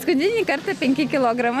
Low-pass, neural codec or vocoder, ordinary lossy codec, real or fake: 14.4 kHz; none; Opus, 64 kbps; real